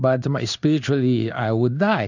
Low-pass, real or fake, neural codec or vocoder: 7.2 kHz; fake; codec, 16 kHz in and 24 kHz out, 1 kbps, XY-Tokenizer